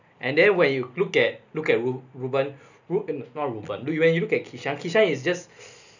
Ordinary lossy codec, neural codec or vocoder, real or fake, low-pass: none; none; real; 7.2 kHz